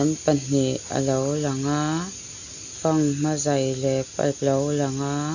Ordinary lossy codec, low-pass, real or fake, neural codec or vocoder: none; 7.2 kHz; real; none